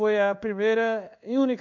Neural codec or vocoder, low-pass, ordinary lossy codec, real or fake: codec, 16 kHz in and 24 kHz out, 1 kbps, XY-Tokenizer; 7.2 kHz; none; fake